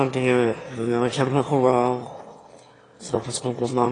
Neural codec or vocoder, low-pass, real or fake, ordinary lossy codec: autoencoder, 22.05 kHz, a latent of 192 numbers a frame, VITS, trained on one speaker; 9.9 kHz; fake; AAC, 32 kbps